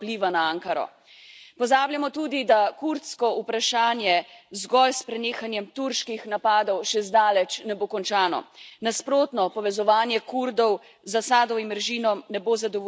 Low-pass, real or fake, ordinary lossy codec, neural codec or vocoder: none; real; none; none